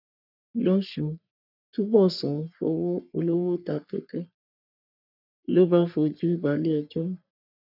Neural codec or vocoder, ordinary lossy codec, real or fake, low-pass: codec, 44.1 kHz, 3.4 kbps, Pupu-Codec; none; fake; 5.4 kHz